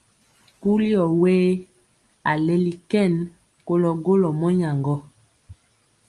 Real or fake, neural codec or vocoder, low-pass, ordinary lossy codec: real; none; 10.8 kHz; Opus, 32 kbps